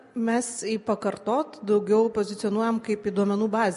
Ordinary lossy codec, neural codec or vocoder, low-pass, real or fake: MP3, 48 kbps; none; 14.4 kHz; real